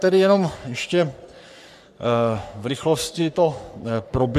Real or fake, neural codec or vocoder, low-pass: fake; codec, 44.1 kHz, 3.4 kbps, Pupu-Codec; 14.4 kHz